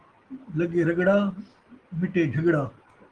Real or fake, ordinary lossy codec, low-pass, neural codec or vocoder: real; Opus, 16 kbps; 9.9 kHz; none